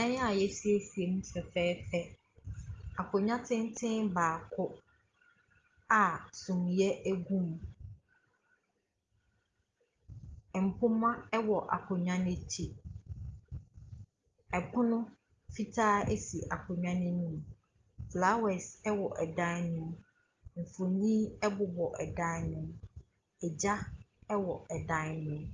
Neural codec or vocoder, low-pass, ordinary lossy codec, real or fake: none; 7.2 kHz; Opus, 32 kbps; real